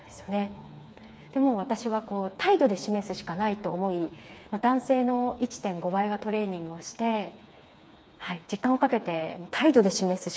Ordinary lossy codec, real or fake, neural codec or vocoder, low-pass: none; fake; codec, 16 kHz, 4 kbps, FreqCodec, smaller model; none